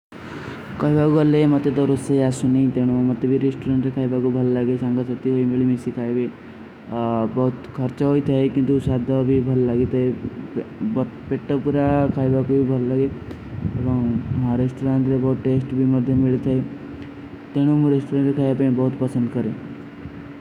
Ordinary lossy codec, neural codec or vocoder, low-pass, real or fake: none; autoencoder, 48 kHz, 128 numbers a frame, DAC-VAE, trained on Japanese speech; 19.8 kHz; fake